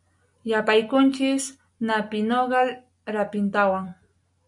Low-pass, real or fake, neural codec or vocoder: 10.8 kHz; real; none